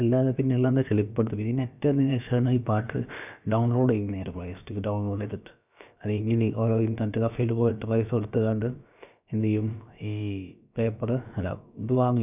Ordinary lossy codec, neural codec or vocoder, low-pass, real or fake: none; codec, 16 kHz, about 1 kbps, DyCAST, with the encoder's durations; 3.6 kHz; fake